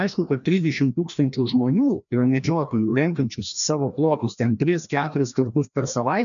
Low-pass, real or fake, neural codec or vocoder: 7.2 kHz; fake; codec, 16 kHz, 1 kbps, FreqCodec, larger model